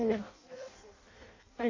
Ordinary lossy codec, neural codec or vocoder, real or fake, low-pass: AAC, 32 kbps; codec, 16 kHz in and 24 kHz out, 0.6 kbps, FireRedTTS-2 codec; fake; 7.2 kHz